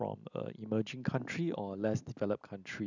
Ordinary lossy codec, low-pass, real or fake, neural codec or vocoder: none; 7.2 kHz; real; none